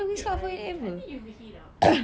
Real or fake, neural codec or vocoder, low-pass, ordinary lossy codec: real; none; none; none